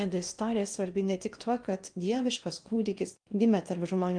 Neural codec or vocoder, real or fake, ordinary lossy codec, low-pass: codec, 16 kHz in and 24 kHz out, 0.8 kbps, FocalCodec, streaming, 65536 codes; fake; Opus, 32 kbps; 9.9 kHz